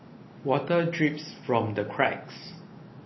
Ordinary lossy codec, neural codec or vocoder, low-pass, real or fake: MP3, 24 kbps; none; 7.2 kHz; real